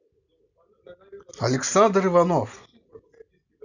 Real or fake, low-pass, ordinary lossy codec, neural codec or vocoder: real; 7.2 kHz; none; none